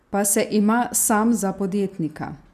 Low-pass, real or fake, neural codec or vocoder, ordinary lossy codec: 14.4 kHz; real; none; none